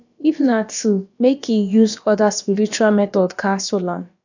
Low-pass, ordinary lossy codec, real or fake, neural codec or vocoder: 7.2 kHz; none; fake; codec, 16 kHz, about 1 kbps, DyCAST, with the encoder's durations